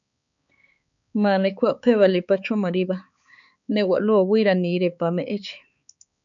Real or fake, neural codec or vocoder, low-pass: fake; codec, 16 kHz, 4 kbps, X-Codec, HuBERT features, trained on balanced general audio; 7.2 kHz